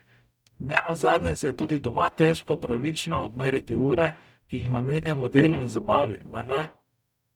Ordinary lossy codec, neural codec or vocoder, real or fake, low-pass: none; codec, 44.1 kHz, 0.9 kbps, DAC; fake; 19.8 kHz